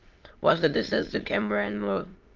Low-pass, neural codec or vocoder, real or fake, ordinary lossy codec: 7.2 kHz; autoencoder, 22.05 kHz, a latent of 192 numbers a frame, VITS, trained on many speakers; fake; Opus, 24 kbps